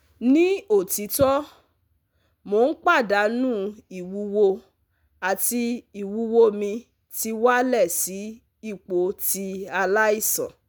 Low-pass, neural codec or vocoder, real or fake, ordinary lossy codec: none; none; real; none